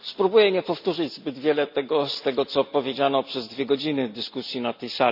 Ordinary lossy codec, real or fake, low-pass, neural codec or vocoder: none; real; 5.4 kHz; none